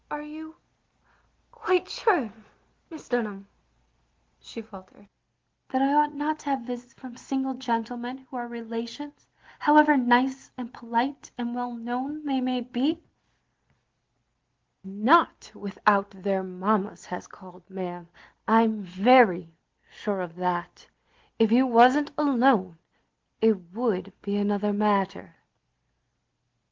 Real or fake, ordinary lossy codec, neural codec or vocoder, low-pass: real; Opus, 16 kbps; none; 7.2 kHz